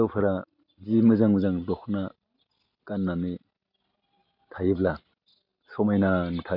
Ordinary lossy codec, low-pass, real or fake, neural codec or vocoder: none; 5.4 kHz; real; none